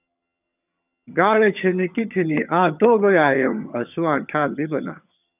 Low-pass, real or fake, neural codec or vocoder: 3.6 kHz; fake; vocoder, 22.05 kHz, 80 mel bands, HiFi-GAN